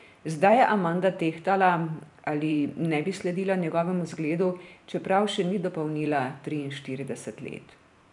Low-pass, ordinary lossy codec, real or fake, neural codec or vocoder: 10.8 kHz; none; fake; vocoder, 44.1 kHz, 128 mel bands every 512 samples, BigVGAN v2